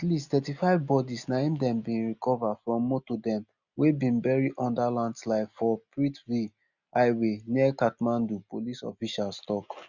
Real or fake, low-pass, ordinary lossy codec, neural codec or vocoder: real; 7.2 kHz; none; none